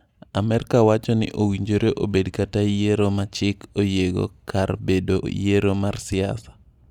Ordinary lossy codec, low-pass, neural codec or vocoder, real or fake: Opus, 64 kbps; 19.8 kHz; none; real